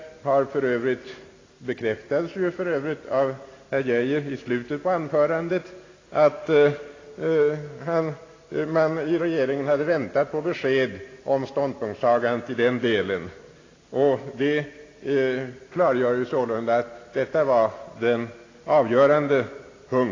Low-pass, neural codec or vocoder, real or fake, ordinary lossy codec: 7.2 kHz; none; real; AAC, 32 kbps